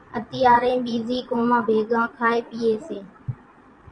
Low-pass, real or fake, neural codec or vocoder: 9.9 kHz; fake; vocoder, 22.05 kHz, 80 mel bands, Vocos